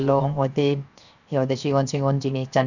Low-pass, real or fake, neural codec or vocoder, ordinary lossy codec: 7.2 kHz; fake; codec, 16 kHz, 0.7 kbps, FocalCodec; none